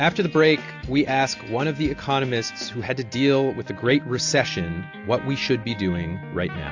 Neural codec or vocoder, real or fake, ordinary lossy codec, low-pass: none; real; AAC, 48 kbps; 7.2 kHz